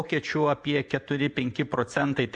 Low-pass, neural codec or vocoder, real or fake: 10.8 kHz; vocoder, 24 kHz, 100 mel bands, Vocos; fake